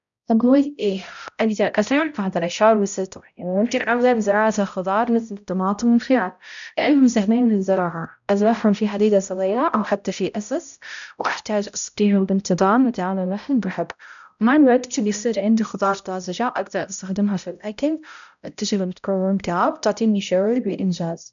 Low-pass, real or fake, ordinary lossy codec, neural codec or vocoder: 7.2 kHz; fake; none; codec, 16 kHz, 0.5 kbps, X-Codec, HuBERT features, trained on balanced general audio